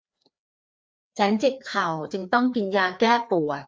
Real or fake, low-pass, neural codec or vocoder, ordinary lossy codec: fake; none; codec, 16 kHz, 2 kbps, FreqCodec, larger model; none